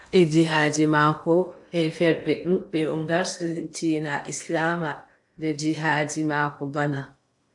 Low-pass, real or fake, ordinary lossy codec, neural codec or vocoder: 10.8 kHz; fake; AAC, 64 kbps; codec, 16 kHz in and 24 kHz out, 0.8 kbps, FocalCodec, streaming, 65536 codes